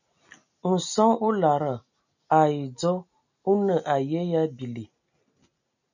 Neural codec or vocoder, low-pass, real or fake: none; 7.2 kHz; real